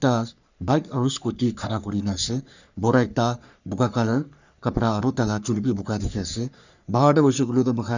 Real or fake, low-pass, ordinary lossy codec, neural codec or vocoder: fake; 7.2 kHz; none; codec, 44.1 kHz, 3.4 kbps, Pupu-Codec